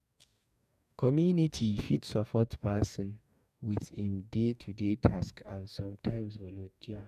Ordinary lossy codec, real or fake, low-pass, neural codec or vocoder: none; fake; 14.4 kHz; codec, 44.1 kHz, 2.6 kbps, DAC